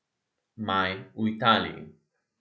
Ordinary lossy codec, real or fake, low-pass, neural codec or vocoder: none; real; none; none